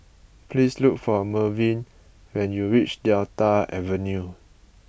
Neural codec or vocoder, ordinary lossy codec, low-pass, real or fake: none; none; none; real